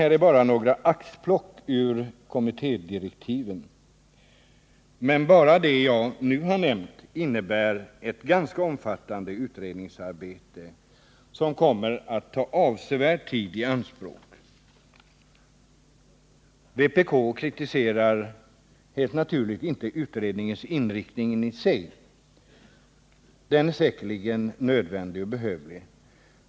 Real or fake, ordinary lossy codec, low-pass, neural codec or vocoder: real; none; none; none